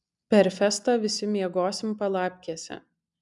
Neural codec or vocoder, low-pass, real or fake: none; 10.8 kHz; real